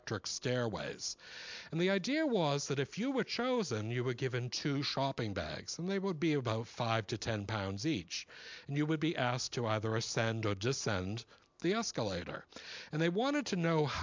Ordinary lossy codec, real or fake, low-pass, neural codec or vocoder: MP3, 64 kbps; real; 7.2 kHz; none